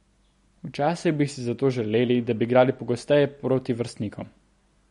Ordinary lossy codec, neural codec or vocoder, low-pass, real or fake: MP3, 48 kbps; none; 10.8 kHz; real